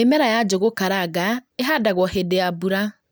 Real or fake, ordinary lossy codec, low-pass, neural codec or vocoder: real; none; none; none